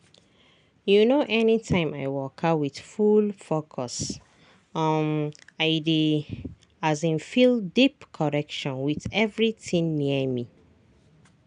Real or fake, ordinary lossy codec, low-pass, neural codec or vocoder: real; none; 9.9 kHz; none